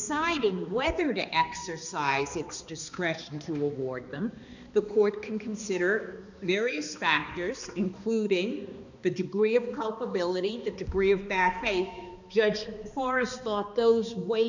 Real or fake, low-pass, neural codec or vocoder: fake; 7.2 kHz; codec, 16 kHz, 2 kbps, X-Codec, HuBERT features, trained on balanced general audio